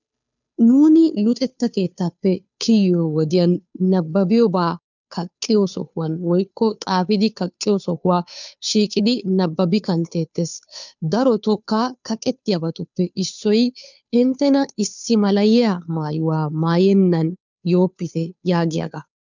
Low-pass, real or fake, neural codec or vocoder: 7.2 kHz; fake; codec, 16 kHz, 2 kbps, FunCodec, trained on Chinese and English, 25 frames a second